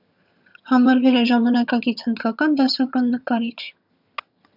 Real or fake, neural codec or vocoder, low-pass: fake; vocoder, 22.05 kHz, 80 mel bands, HiFi-GAN; 5.4 kHz